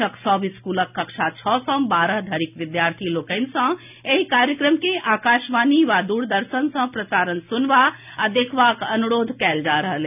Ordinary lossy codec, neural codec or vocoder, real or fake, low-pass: none; none; real; 3.6 kHz